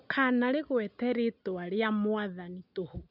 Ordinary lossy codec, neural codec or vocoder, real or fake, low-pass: none; none; real; 5.4 kHz